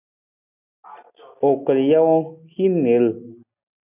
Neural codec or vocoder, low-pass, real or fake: none; 3.6 kHz; real